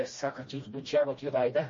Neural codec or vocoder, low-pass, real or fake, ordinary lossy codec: codec, 16 kHz, 1 kbps, FreqCodec, smaller model; 7.2 kHz; fake; MP3, 32 kbps